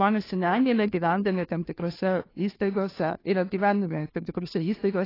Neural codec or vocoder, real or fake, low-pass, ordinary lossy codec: codec, 16 kHz, 2 kbps, FunCodec, trained on Chinese and English, 25 frames a second; fake; 5.4 kHz; AAC, 24 kbps